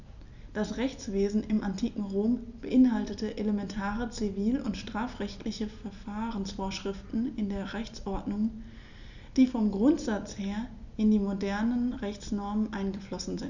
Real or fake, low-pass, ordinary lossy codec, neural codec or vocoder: real; 7.2 kHz; none; none